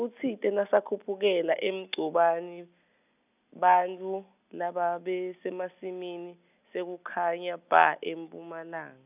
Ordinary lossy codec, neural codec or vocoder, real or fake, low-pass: none; none; real; 3.6 kHz